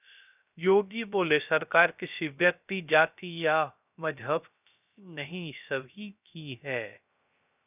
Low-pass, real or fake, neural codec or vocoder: 3.6 kHz; fake; codec, 16 kHz, 0.3 kbps, FocalCodec